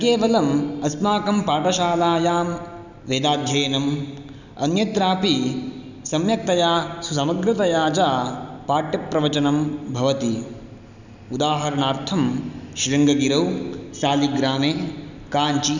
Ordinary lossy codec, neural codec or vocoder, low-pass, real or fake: none; none; 7.2 kHz; real